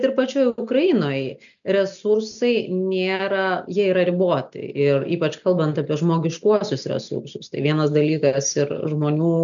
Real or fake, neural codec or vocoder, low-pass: real; none; 7.2 kHz